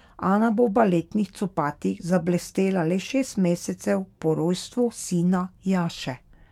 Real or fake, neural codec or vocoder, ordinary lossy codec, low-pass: fake; codec, 44.1 kHz, 7.8 kbps, DAC; MP3, 96 kbps; 19.8 kHz